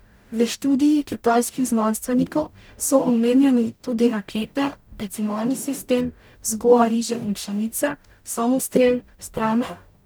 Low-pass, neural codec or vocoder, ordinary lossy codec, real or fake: none; codec, 44.1 kHz, 0.9 kbps, DAC; none; fake